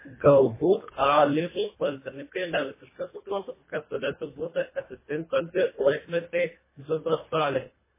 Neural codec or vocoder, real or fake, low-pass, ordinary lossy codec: codec, 24 kHz, 1.5 kbps, HILCodec; fake; 3.6 kHz; MP3, 16 kbps